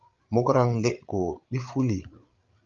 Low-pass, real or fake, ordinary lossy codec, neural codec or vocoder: 7.2 kHz; fake; Opus, 32 kbps; codec, 16 kHz, 8 kbps, FreqCodec, larger model